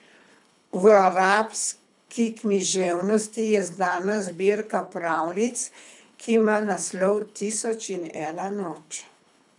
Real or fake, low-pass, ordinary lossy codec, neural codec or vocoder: fake; 10.8 kHz; none; codec, 24 kHz, 3 kbps, HILCodec